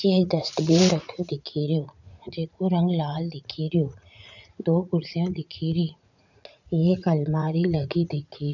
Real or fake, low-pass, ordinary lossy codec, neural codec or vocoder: fake; 7.2 kHz; none; vocoder, 44.1 kHz, 80 mel bands, Vocos